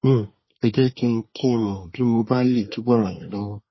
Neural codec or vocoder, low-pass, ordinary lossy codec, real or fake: codec, 24 kHz, 1 kbps, SNAC; 7.2 kHz; MP3, 24 kbps; fake